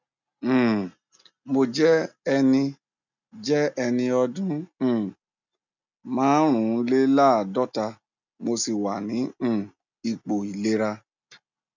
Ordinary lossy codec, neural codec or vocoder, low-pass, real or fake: none; none; 7.2 kHz; real